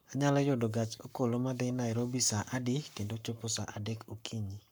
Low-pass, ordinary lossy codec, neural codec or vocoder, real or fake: none; none; codec, 44.1 kHz, 7.8 kbps, Pupu-Codec; fake